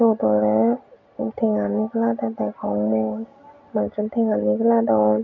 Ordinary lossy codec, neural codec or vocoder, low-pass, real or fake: none; none; 7.2 kHz; real